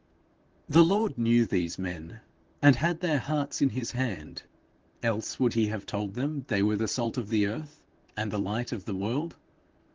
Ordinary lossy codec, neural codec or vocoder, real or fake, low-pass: Opus, 16 kbps; vocoder, 44.1 kHz, 128 mel bands, Pupu-Vocoder; fake; 7.2 kHz